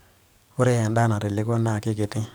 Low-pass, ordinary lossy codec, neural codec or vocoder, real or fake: none; none; codec, 44.1 kHz, 7.8 kbps, DAC; fake